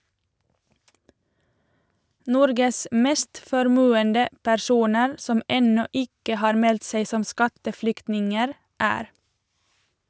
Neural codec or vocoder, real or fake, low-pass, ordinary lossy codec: none; real; none; none